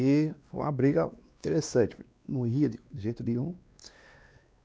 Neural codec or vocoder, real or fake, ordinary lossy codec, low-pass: codec, 16 kHz, 2 kbps, X-Codec, WavLM features, trained on Multilingual LibriSpeech; fake; none; none